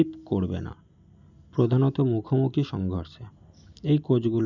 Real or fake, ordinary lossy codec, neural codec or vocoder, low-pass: real; none; none; 7.2 kHz